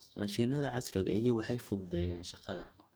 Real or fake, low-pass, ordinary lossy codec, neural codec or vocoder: fake; none; none; codec, 44.1 kHz, 2.6 kbps, DAC